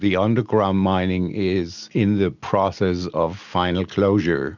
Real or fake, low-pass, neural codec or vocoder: real; 7.2 kHz; none